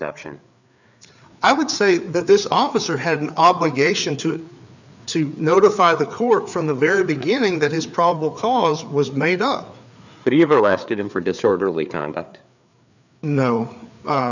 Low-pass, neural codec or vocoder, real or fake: 7.2 kHz; codec, 16 kHz, 4 kbps, FreqCodec, larger model; fake